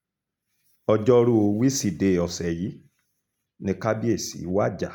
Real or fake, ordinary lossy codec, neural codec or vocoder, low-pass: real; none; none; 19.8 kHz